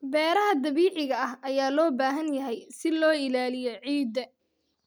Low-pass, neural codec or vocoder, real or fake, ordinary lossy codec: none; none; real; none